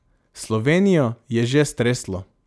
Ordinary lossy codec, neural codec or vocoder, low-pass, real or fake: none; none; none; real